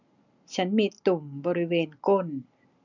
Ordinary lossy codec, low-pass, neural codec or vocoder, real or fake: none; 7.2 kHz; none; real